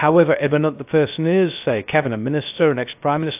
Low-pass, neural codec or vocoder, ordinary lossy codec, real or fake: 3.6 kHz; codec, 16 kHz, 0.3 kbps, FocalCodec; AAC, 32 kbps; fake